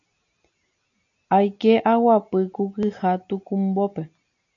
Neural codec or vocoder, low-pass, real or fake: none; 7.2 kHz; real